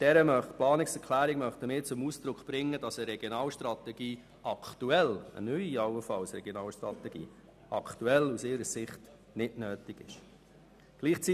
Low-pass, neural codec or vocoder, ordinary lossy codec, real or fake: 14.4 kHz; none; none; real